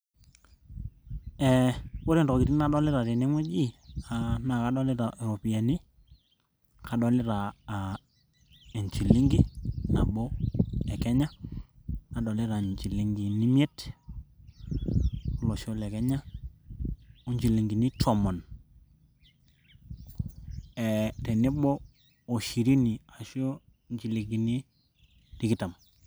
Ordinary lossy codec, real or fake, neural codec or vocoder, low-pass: none; real; none; none